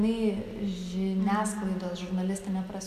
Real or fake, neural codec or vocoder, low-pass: real; none; 14.4 kHz